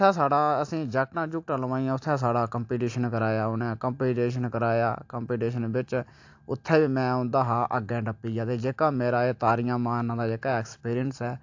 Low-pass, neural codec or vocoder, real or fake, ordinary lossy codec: 7.2 kHz; none; real; AAC, 48 kbps